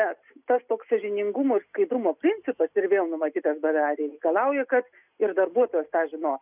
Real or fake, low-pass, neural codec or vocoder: real; 3.6 kHz; none